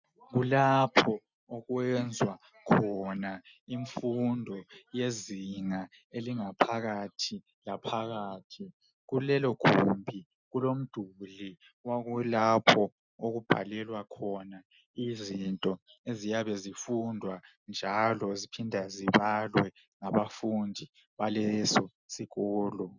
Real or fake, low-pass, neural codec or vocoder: real; 7.2 kHz; none